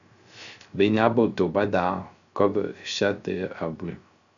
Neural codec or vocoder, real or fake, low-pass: codec, 16 kHz, 0.3 kbps, FocalCodec; fake; 7.2 kHz